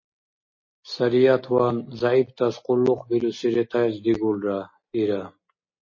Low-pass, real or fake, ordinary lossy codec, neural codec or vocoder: 7.2 kHz; real; MP3, 32 kbps; none